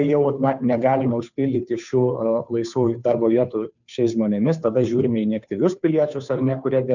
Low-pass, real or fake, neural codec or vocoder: 7.2 kHz; fake; codec, 16 kHz, 2 kbps, FunCodec, trained on Chinese and English, 25 frames a second